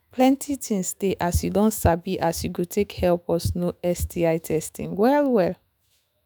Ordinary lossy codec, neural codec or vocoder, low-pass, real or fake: none; autoencoder, 48 kHz, 128 numbers a frame, DAC-VAE, trained on Japanese speech; none; fake